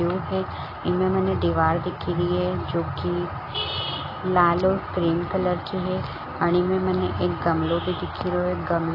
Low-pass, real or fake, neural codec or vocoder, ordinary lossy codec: 5.4 kHz; real; none; AAC, 24 kbps